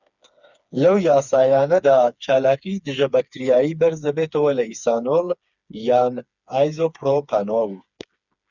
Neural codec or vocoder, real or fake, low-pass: codec, 16 kHz, 4 kbps, FreqCodec, smaller model; fake; 7.2 kHz